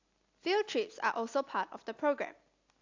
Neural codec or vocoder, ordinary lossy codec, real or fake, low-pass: none; AAC, 48 kbps; real; 7.2 kHz